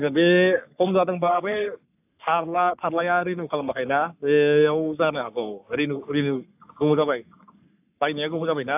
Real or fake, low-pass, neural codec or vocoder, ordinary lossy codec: fake; 3.6 kHz; codec, 44.1 kHz, 3.4 kbps, Pupu-Codec; AAC, 32 kbps